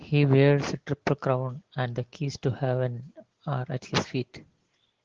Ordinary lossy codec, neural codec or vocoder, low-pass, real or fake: Opus, 16 kbps; none; 7.2 kHz; real